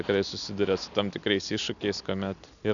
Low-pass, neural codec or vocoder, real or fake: 7.2 kHz; none; real